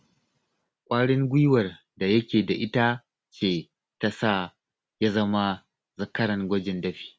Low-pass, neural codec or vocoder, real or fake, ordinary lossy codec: none; none; real; none